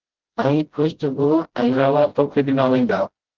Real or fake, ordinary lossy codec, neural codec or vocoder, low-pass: fake; Opus, 16 kbps; codec, 16 kHz, 0.5 kbps, FreqCodec, smaller model; 7.2 kHz